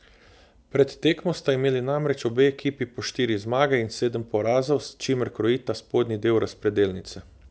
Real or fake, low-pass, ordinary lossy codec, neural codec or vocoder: real; none; none; none